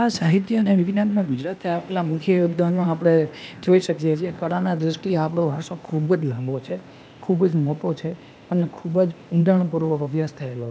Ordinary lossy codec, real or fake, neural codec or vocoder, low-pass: none; fake; codec, 16 kHz, 0.8 kbps, ZipCodec; none